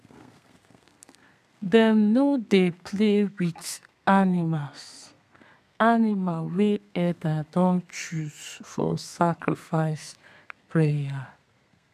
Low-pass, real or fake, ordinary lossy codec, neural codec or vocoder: 14.4 kHz; fake; none; codec, 32 kHz, 1.9 kbps, SNAC